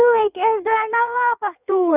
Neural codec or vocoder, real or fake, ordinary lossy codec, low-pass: codec, 16 kHz in and 24 kHz out, 1.1 kbps, FireRedTTS-2 codec; fake; none; 3.6 kHz